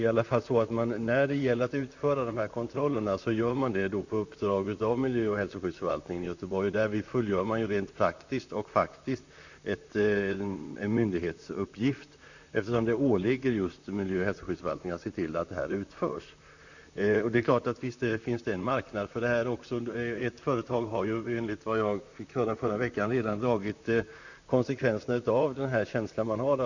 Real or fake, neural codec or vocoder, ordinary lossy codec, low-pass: fake; vocoder, 44.1 kHz, 128 mel bands, Pupu-Vocoder; none; 7.2 kHz